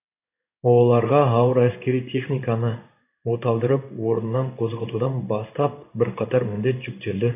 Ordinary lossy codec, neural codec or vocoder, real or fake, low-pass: none; codec, 16 kHz in and 24 kHz out, 1 kbps, XY-Tokenizer; fake; 3.6 kHz